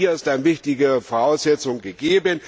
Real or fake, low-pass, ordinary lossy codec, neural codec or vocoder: real; none; none; none